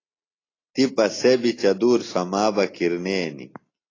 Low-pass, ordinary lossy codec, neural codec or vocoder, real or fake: 7.2 kHz; AAC, 32 kbps; none; real